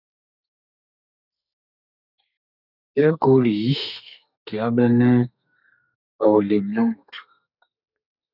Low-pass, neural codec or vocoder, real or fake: 5.4 kHz; codec, 32 kHz, 1.9 kbps, SNAC; fake